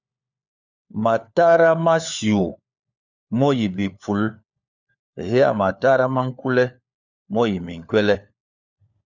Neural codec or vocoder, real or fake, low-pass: codec, 16 kHz, 4 kbps, FunCodec, trained on LibriTTS, 50 frames a second; fake; 7.2 kHz